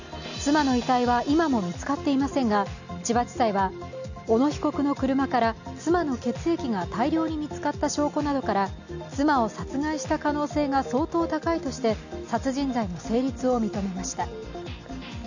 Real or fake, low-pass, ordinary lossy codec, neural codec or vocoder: real; 7.2 kHz; none; none